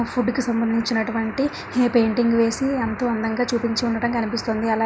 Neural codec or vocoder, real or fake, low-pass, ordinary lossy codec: none; real; none; none